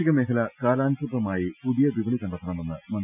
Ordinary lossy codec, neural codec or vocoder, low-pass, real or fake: none; none; 3.6 kHz; real